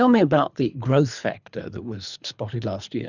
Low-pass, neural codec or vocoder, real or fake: 7.2 kHz; codec, 24 kHz, 3 kbps, HILCodec; fake